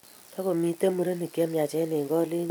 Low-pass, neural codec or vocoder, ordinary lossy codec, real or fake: none; none; none; real